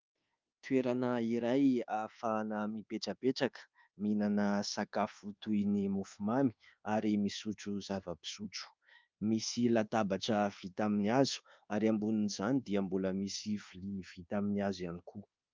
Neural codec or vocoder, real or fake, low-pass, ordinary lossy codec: codec, 24 kHz, 1.2 kbps, DualCodec; fake; 7.2 kHz; Opus, 32 kbps